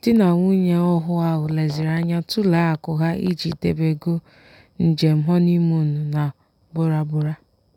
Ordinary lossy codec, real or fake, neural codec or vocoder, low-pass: none; real; none; 19.8 kHz